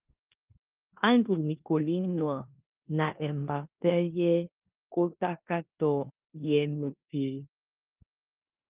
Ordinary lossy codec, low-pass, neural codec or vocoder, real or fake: Opus, 32 kbps; 3.6 kHz; codec, 16 kHz in and 24 kHz out, 0.9 kbps, LongCat-Audio-Codec, four codebook decoder; fake